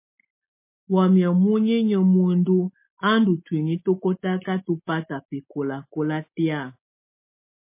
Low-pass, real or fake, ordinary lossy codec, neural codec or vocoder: 3.6 kHz; real; MP3, 24 kbps; none